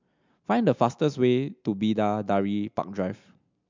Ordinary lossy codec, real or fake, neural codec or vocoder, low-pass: MP3, 64 kbps; real; none; 7.2 kHz